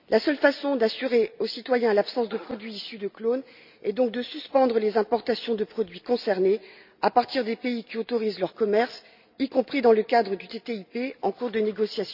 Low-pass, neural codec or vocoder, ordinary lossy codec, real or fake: 5.4 kHz; none; none; real